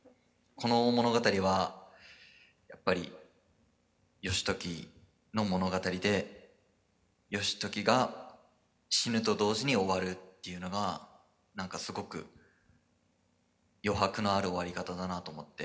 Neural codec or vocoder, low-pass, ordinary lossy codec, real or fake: none; none; none; real